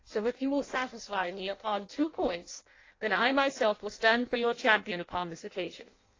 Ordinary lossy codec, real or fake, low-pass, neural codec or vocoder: AAC, 32 kbps; fake; 7.2 kHz; codec, 16 kHz in and 24 kHz out, 0.6 kbps, FireRedTTS-2 codec